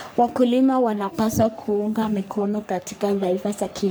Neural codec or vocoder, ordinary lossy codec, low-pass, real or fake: codec, 44.1 kHz, 3.4 kbps, Pupu-Codec; none; none; fake